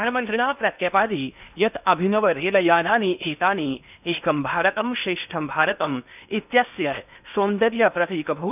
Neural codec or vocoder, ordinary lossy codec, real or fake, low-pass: codec, 16 kHz in and 24 kHz out, 0.8 kbps, FocalCodec, streaming, 65536 codes; none; fake; 3.6 kHz